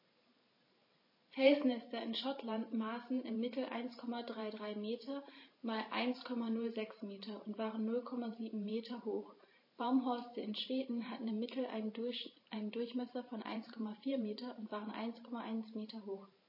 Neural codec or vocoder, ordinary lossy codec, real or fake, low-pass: vocoder, 44.1 kHz, 128 mel bands every 512 samples, BigVGAN v2; MP3, 24 kbps; fake; 5.4 kHz